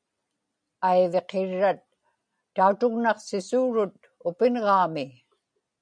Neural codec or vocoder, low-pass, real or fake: none; 9.9 kHz; real